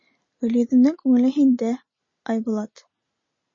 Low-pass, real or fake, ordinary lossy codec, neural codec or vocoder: 7.2 kHz; real; MP3, 32 kbps; none